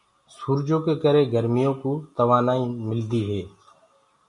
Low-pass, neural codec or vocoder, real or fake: 10.8 kHz; none; real